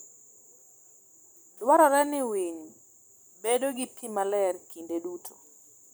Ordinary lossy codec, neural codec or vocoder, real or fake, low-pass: none; vocoder, 44.1 kHz, 128 mel bands every 256 samples, BigVGAN v2; fake; none